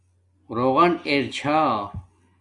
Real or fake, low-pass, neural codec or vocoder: real; 10.8 kHz; none